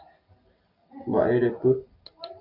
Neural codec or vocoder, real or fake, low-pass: none; real; 5.4 kHz